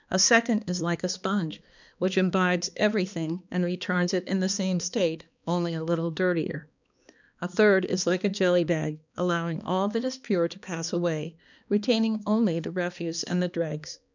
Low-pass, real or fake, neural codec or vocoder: 7.2 kHz; fake; codec, 16 kHz, 2 kbps, X-Codec, HuBERT features, trained on balanced general audio